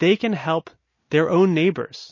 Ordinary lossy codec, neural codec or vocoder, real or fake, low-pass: MP3, 32 kbps; none; real; 7.2 kHz